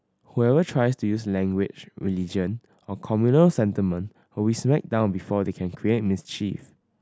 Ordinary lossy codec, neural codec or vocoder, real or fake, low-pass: none; none; real; none